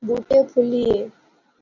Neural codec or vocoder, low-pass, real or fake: none; 7.2 kHz; real